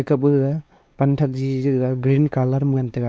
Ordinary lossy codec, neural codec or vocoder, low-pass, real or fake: none; codec, 16 kHz, 4 kbps, X-Codec, WavLM features, trained on Multilingual LibriSpeech; none; fake